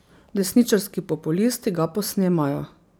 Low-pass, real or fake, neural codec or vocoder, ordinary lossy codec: none; fake; vocoder, 44.1 kHz, 128 mel bands every 256 samples, BigVGAN v2; none